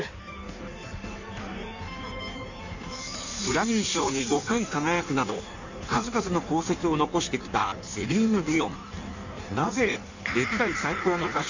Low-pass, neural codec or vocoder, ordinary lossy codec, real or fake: 7.2 kHz; codec, 16 kHz in and 24 kHz out, 1.1 kbps, FireRedTTS-2 codec; none; fake